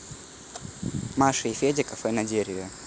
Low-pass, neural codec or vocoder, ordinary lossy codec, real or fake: none; none; none; real